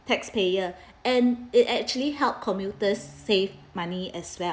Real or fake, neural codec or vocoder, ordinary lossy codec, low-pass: real; none; none; none